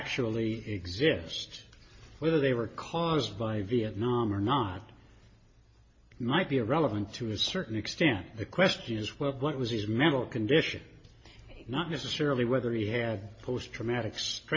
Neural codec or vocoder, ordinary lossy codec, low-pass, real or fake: none; MP3, 32 kbps; 7.2 kHz; real